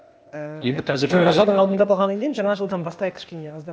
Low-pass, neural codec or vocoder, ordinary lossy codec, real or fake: none; codec, 16 kHz, 0.8 kbps, ZipCodec; none; fake